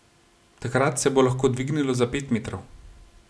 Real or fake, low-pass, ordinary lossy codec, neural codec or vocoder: real; none; none; none